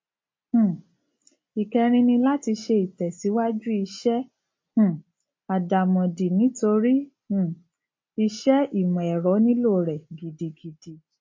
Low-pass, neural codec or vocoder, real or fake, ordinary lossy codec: 7.2 kHz; none; real; MP3, 32 kbps